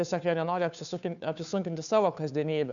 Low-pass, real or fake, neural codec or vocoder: 7.2 kHz; fake; codec, 16 kHz, 2 kbps, FunCodec, trained on Chinese and English, 25 frames a second